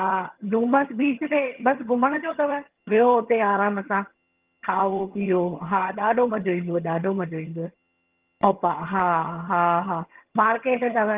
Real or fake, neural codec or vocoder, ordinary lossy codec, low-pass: fake; vocoder, 22.05 kHz, 80 mel bands, HiFi-GAN; Opus, 24 kbps; 3.6 kHz